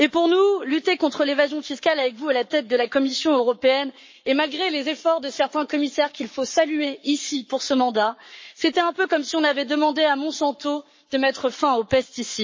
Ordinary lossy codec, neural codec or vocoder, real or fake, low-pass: MP3, 32 kbps; codec, 44.1 kHz, 7.8 kbps, Pupu-Codec; fake; 7.2 kHz